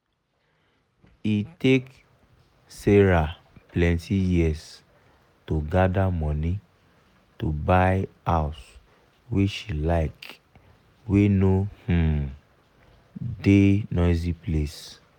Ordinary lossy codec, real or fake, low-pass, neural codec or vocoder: none; real; 19.8 kHz; none